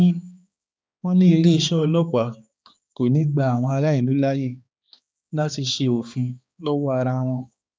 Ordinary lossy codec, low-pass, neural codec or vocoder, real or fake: none; none; codec, 16 kHz, 2 kbps, X-Codec, HuBERT features, trained on balanced general audio; fake